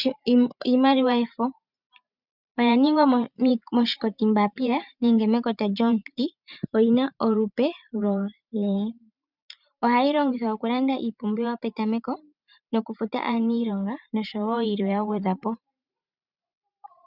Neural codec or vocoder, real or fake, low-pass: vocoder, 44.1 kHz, 128 mel bands every 512 samples, BigVGAN v2; fake; 5.4 kHz